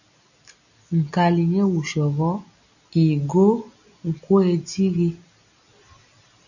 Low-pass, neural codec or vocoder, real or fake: 7.2 kHz; none; real